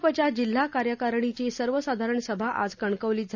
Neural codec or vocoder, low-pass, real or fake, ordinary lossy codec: none; 7.2 kHz; real; none